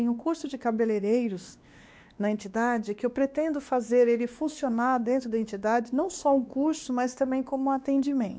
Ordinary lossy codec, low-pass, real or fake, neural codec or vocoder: none; none; fake; codec, 16 kHz, 2 kbps, X-Codec, WavLM features, trained on Multilingual LibriSpeech